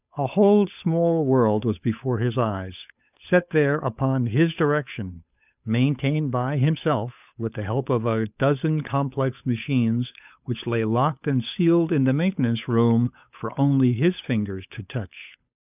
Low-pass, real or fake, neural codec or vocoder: 3.6 kHz; fake; codec, 16 kHz, 8 kbps, FunCodec, trained on Chinese and English, 25 frames a second